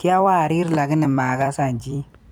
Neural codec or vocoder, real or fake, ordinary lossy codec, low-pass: vocoder, 44.1 kHz, 128 mel bands every 256 samples, BigVGAN v2; fake; none; none